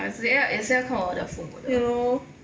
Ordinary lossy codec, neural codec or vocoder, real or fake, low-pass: none; none; real; none